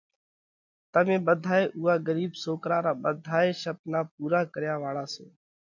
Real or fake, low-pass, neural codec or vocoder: real; 7.2 kHz; none